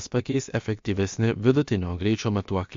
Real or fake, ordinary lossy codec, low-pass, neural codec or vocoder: fake; MP3, 48 kbps; 7.2 kHz; codec, 16 kHz, about 1 kbps, DyCAST, with the encoder's durations